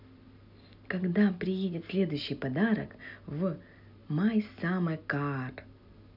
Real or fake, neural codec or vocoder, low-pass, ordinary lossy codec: real; none; 5.4 kHz; none